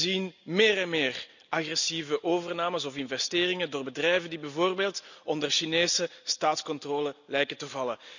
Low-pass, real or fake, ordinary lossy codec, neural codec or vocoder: 7.2 kHz; real; none; none